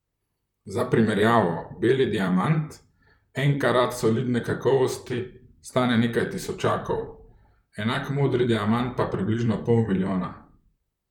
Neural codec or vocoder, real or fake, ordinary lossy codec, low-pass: vocoder, 44.1 kHz, 128 mel bands, Pupu-Vocoder; fake; none; 19.8 kHz